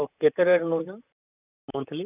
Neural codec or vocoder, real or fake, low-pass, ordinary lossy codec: vocoder, 44.1 kHz, 128 mel bands, Pupu-Vocoder; fake; 3.6 kHz; none